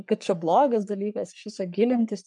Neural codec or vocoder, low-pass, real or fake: codec, 44.1 kHz, 3.4 kbps, Pupu-Codec; 10.8 kHz; fake